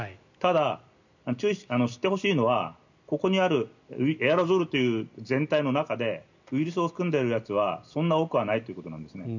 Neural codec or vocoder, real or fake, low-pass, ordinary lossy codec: none; real; 7.2 kHz; none